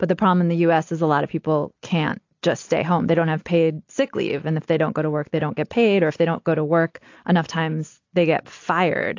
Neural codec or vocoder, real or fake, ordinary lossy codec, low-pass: none; real; AAC, 48 kbps; 7.2 kHz